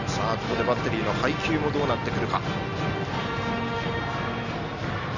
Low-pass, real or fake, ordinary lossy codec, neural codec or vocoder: 7.2 kHz; real; none; none